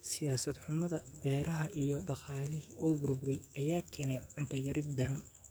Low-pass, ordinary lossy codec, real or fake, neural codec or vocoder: none; none; fake; codec, 44.1 kHz, 2.6 kbps, SNAC